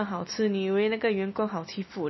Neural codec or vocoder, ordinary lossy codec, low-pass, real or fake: none; MP3, 24 kbps; 7.2 kHz; real